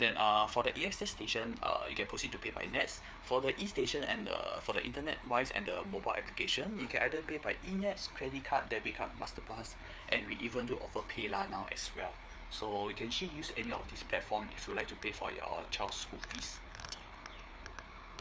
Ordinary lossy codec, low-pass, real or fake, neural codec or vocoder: none; none; fake; codec, 16 kHz, 4 kbps, FreqCodec, larger model